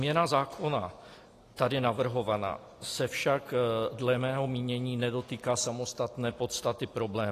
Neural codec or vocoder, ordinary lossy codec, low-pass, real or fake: none; AAC, 48 kbps; 14.4 kHz; real